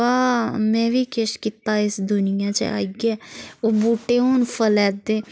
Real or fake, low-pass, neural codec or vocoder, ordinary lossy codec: real; none; none; none